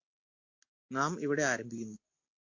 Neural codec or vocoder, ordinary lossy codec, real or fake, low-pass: none; AAC, 48 kbps; real; 7.2 kHz